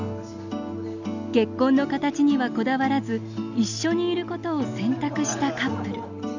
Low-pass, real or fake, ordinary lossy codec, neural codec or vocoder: 7.2 kHz; real; none; none